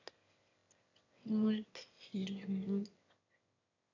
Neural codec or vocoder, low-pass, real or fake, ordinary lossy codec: autoencoder, 22.05 kHz, a latent of 192 numbers a frame, VITS, trained on one speaker; 7.2 kHz; fake; AAC, 48 kbps